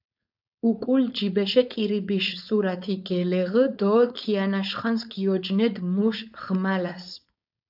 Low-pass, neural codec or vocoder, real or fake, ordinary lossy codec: 5.4 kHz; codec, 16 kHz, 4.8 kbps, FACodec; fake; AAC, 48 kbps